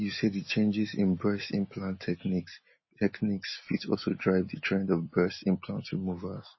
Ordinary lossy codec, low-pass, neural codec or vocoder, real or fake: MP3, 24 kbps; 7.2 kHz; none; real